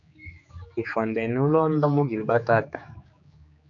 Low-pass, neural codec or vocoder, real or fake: 7.2 kHz; codec, 16 kHz, 4 kbps, X-Codec, HuBERT features, trained on general audio; fake